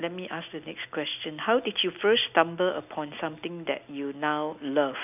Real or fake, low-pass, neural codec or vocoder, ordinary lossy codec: real; 3.6 kHz; none; none